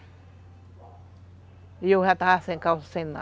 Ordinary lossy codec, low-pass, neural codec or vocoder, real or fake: none; none; none; real